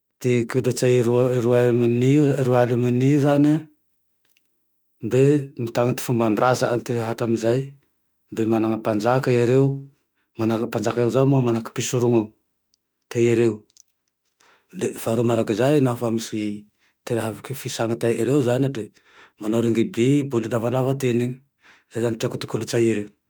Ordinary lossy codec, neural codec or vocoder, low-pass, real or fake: none; autoencoder, 48 kHz, 32 numbers a frame, DAC-VAE, trained on Japanese speech; none; fake